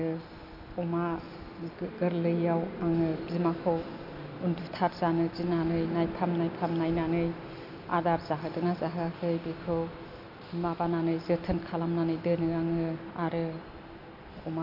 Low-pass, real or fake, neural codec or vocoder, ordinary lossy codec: 5.4 kHz; real; none; none